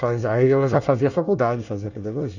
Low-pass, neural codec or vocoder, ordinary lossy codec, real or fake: 7.2 kHz; codec, 24 kHz, 1 kbps, SNAC; none; fake